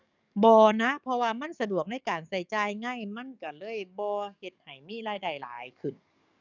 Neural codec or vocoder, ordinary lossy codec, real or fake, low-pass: codec, 44.1 kHz, 7.8 kbps, DAC; none; fake; 7.2 kHz